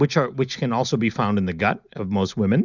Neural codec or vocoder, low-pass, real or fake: none; 7.2 kHz; real